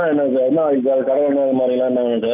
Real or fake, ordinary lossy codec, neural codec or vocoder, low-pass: real; none; none; 3.6 kHz